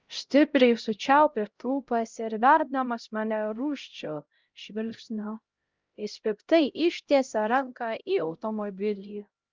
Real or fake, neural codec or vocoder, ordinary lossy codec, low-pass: fake; codec, 16 kHz, 0.5 kbps, X-Codec, HuBERT features, trained on LibriSpeech; Opus, 24 kbps; 7.2 kHz